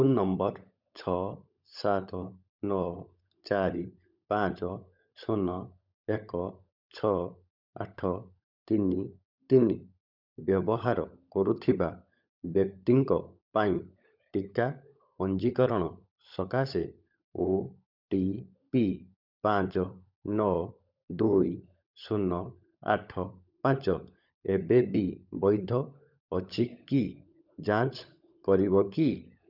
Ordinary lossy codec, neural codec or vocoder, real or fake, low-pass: none; codec, 16 kHz, 16 kbps, FunCodec, trained on LibriTTS, 50 frames a second; fake; 5.4 kHz